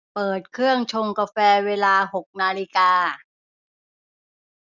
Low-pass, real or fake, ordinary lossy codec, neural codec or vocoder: 7.2 kHz; real; none; none